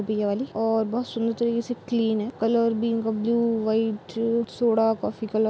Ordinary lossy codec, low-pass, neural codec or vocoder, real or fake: none; none; none; real